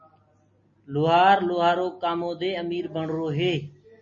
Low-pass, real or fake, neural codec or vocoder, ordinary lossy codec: 7.2 kHz; real; none; MP3, 32 kbps